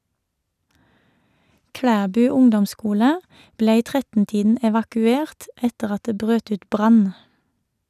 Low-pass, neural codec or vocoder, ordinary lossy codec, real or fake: 14.4 kHz; none; none; real